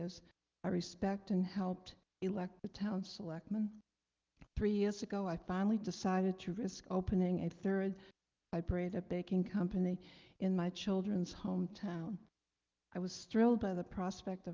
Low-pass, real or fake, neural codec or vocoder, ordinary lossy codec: 7.2 kHz; real; none; Opus, 32 kbps